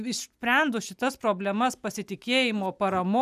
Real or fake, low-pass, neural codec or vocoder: real; 14.4 kHz; none